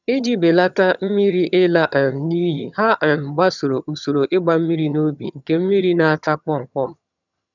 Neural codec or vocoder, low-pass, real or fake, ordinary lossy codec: vocoder, 22.05 kHz, 80 mel bands, HiFi-GAN; 7.2 kHz; fake; none